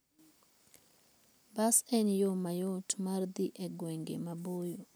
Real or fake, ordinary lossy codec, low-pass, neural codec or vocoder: real; none; none; none